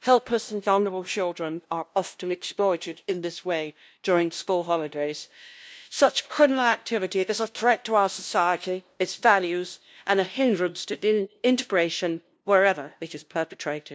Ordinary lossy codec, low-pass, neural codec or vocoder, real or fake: none; none; codec, 16 kHz, 0.5 kbps, FunCodec, trained on LibriTTS, 25 frames a second; fake